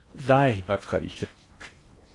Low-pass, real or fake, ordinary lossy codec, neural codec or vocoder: 10.8 kHz; fake; AAC, 64 kbps; codec, 16 kHz in and 24 kHz out, 0.8 kbps, FocalCodec, streaming, 65536 codes